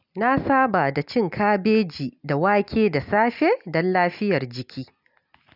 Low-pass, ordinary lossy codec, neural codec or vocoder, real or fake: 5.4 kHz; none; none; real